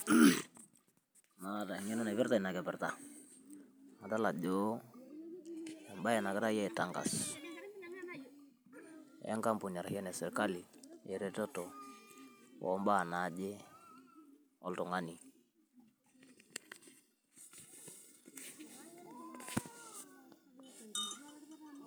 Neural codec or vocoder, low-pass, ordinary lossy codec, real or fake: none; none; none; real